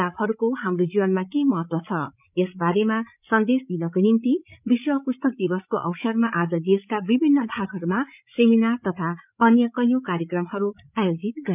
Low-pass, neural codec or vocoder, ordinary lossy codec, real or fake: 3.6 kHz; codec, 16 kHz, 8 kbps, FreqCodec, larger model; none; fake